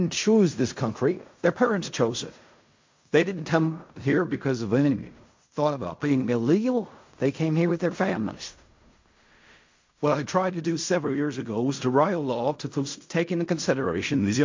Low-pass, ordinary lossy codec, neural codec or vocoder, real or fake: 7.2 kHz; MP3, 48 kbps; codec, 16 kHz in and 24 kHz out, 0.4 kbps, LongCat-Audio-Codec, fine tuned four codebook decoder; fake